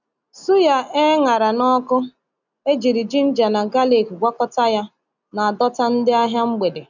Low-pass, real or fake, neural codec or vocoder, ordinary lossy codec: 7.2 kHz; real; none; none